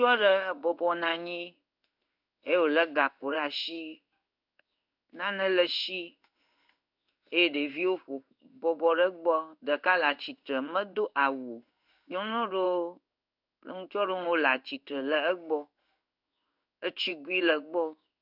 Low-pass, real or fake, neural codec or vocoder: 5.4 kHz; fake; codec, 16 kHz in and 24 kHz out, 1 kbps, XY-Tokenizer